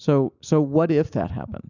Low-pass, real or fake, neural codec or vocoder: 7.2 kHz; real; none